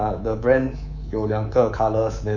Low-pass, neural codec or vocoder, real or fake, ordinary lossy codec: 7.2 kHz; codec, 24 kHz, 3.1 kbps, DualCodec; fake; none